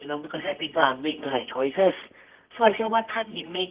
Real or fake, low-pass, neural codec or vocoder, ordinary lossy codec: fake; 3.6 kHz; codec, 24 kHz, 0.9 kbps, WavTokenizer, medium music audio release; Opus, 32 kbps